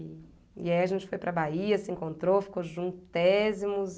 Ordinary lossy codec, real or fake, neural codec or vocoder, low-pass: none; real; none; none